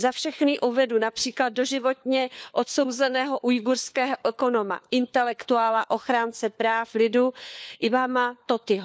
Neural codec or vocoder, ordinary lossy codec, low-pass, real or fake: codec, 16 kHz, 4 kbps, FunCodec, trained on LibriTTS, 50 frames a second; none; none; fake